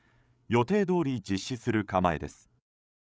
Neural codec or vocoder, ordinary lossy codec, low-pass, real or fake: codec, 16 kHz, 8 kbps, FreqCodec, larger model; none; none; fake